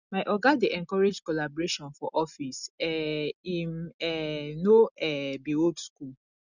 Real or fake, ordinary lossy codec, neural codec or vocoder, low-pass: real; none; none; 7.2 kHz